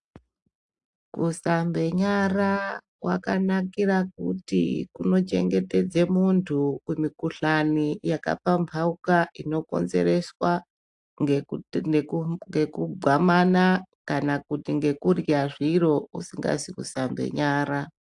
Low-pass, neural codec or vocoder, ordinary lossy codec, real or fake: 10.8 kHz; none; AAC, 64 kbps; real